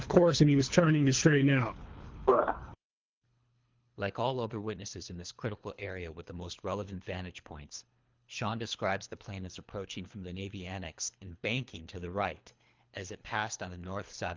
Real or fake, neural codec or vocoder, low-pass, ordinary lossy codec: fake; codec, 24 kHz, 3 kbps, HILCodec; 7.2 kHz; Opus, 24 kbps